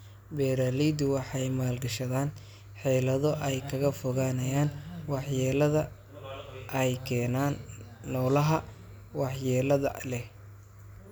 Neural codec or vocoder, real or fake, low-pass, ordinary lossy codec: none; real; none; none